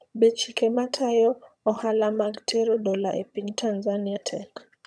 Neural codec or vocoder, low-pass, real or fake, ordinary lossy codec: vocoder, 22.05 kHz, 80 mel bands, HiFi-GAN; none; fake; none